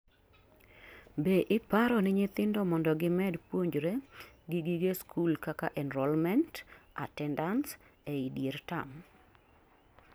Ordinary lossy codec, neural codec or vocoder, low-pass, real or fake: none; none; none; real